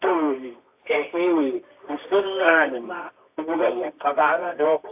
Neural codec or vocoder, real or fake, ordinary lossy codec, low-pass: codec, 24 kHz, 0.9 kbps, WavTokenizer, medium music audio release; fake; AAC, 32 kbps; 3.6 kHz